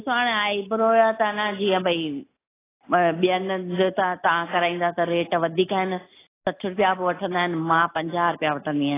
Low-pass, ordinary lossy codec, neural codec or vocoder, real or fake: 3.6 kHz; AAC, 16 kbps; none; real